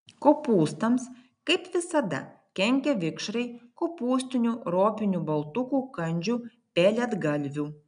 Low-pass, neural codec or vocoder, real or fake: 9.9 kHz; none; real